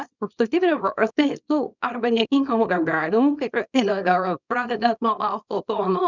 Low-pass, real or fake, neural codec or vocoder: 7.2 kHz; fake; codec, 24 kHz, 0.9 kbps, WavTokenizer, small release